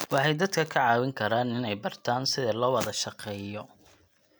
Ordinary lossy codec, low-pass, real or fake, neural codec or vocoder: none; none; real; none